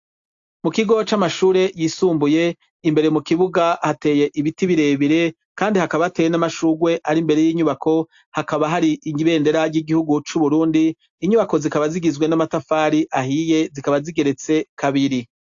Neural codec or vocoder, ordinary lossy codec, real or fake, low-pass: none; AAC, 64 kbps; real; 7.2 kHz